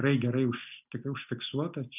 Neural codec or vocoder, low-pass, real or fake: none; 3.6 kHz; real